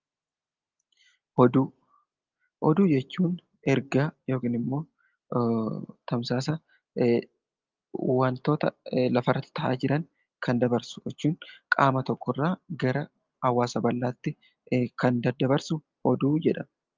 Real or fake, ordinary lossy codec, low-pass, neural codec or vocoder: real; Opus, 24 kbps; 7.2 kHz; none